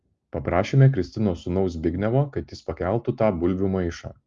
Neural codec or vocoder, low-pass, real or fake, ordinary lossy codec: none; 7.2 kHz; real; Opus, 32 kbps